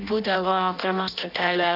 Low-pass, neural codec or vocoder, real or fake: 5.4 kHz; codec, 16 kHz in and 24 kHz out, 0.6 kbps, FireRedTTS-2 codec; fake